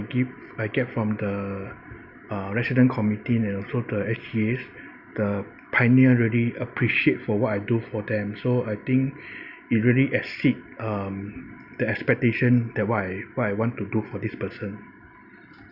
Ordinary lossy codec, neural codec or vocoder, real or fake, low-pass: none; none; real; 5.4 kHz